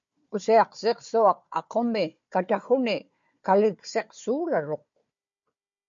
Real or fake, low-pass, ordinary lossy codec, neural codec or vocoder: fake; 7.2 kHz; MP3, 48 kbps; codec, 16 kHz, 4 kbps, FunCodec, trained on Chinese and English, 50 frames a second